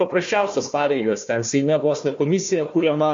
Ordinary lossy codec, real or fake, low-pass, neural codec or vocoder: MP3, 48 kbps; fake; 7.2 kHz; codec, 16 kHz, 1 kbps, FunCodec, trained on Chinese and English, 50 frames a second